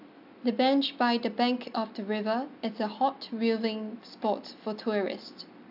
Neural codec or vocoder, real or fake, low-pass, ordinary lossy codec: none; real; 5.4 kHz; AAC, 48 kbps